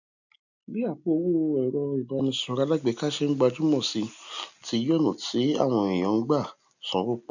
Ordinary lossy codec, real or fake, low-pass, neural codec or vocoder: none; real; 7.2 kHz; none